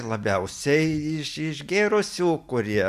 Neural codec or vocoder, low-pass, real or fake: none; 14.4 kHz; real